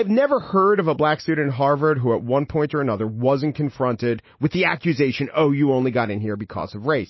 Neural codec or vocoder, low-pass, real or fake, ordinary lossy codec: none; 7.2 kHz; real; MP3, 24 kbps